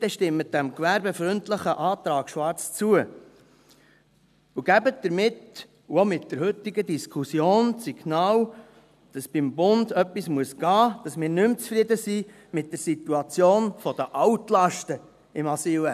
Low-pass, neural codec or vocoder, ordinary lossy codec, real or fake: 14.4 kHz; none; none; real